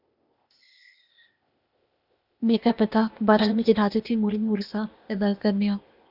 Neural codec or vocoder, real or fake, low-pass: codec, 16 kHz, 0.8 kbps, ZipCodec; fake; 5.4 kHz